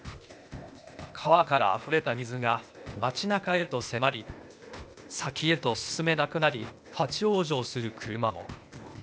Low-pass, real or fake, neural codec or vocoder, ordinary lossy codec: none; fake; codec, 16 kHz, 0.8 kbps, ZipCodec; none